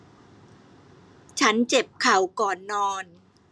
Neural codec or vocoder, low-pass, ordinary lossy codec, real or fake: none; none; none; real